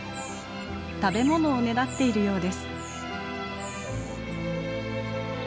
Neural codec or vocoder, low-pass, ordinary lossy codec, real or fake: none; none; none; real